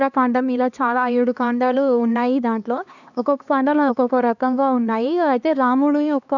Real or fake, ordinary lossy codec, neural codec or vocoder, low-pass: fake; none; codec, 16 kHz, 2 kbps, X-Codec, HuBERT features, trained on LibriSpeech; 7.2 kHz